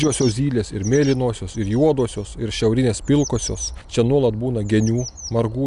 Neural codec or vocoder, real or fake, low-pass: none; real; 10.8 kHz